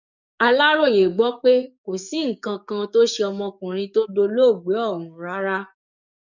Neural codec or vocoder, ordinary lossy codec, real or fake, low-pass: codec, 44.1 kHz, 7.8 kbps, DAC; none; fake; 7.2 kHz